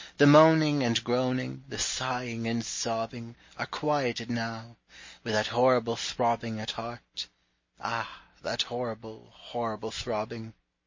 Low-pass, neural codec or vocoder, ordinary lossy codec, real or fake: 7.2 kHz; none; MP3, 32 kbps; real